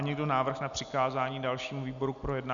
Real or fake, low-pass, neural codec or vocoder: real; 7.2 kHz; none